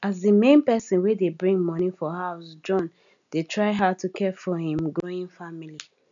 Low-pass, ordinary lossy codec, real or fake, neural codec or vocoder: 7.2 kHz; none; real; none